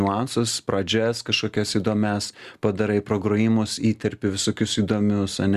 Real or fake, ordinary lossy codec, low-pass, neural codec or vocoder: real; Opus, 64 kbps; 14.4 kHz; none